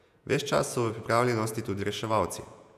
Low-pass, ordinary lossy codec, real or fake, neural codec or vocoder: 14.4 kHz; none; fake; vocoder, 48 kHz, 128 mel bands, Vocos